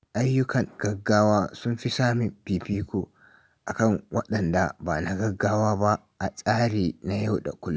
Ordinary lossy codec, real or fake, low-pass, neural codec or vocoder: none; real; none; none